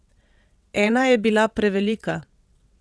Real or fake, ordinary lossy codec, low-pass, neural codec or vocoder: fake; none; none; vocoder, 22.05 kHz, 80 mel bands, WaveNeXt